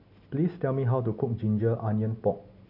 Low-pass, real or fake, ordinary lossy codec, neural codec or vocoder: 5.4 kHz; real; none; none